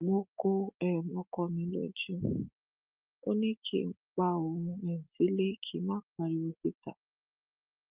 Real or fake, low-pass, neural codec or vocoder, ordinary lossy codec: real; 3.6 kHz; none; Opus, 24 kbps